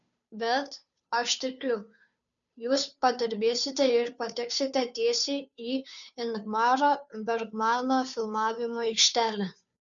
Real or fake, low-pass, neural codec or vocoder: fake; 7.2 kHz; codec, 16 kHz, 2 kbps, FunCodec, trained on Chinese and English, 25 frames a second